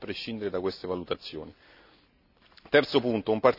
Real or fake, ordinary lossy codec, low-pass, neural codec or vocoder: real; none; 5.4 kHz; none